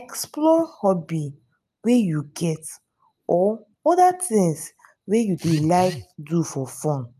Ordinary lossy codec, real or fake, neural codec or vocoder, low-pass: none; real; none; 14.4 kHz